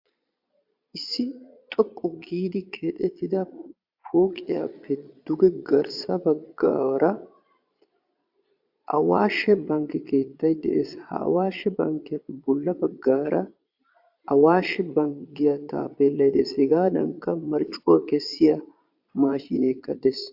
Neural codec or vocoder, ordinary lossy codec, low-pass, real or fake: vocoder, 22.05 kHz, 80 mel bands, Vocos; AAC, 48 kbps; 5.4 kHz; fake